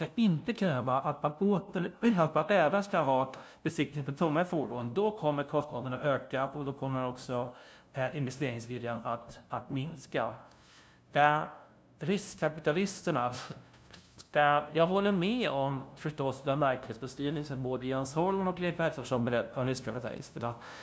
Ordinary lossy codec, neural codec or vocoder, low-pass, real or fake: none; codec, 16 kHz, 0.5 kbps, FunCodec, trained on LibriTTS, 25 frames a second; none; fake